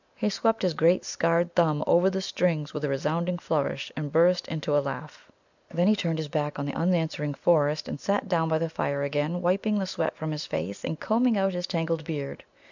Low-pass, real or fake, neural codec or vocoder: 7.2 kHz; real; none